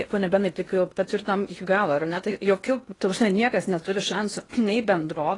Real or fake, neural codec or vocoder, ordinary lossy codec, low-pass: fake; codec, 16 kHz in and 24 kHz out, 0.8 kbps, FocalCodec, streaming, 65536 codes; AAC, 32 kbps; 10.8 kHz